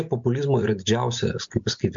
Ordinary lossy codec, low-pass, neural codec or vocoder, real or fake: MP3, 64 kbps; 7.2 kHz; none; real